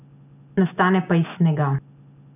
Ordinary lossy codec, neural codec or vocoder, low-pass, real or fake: none; none; 3.6 kHz; real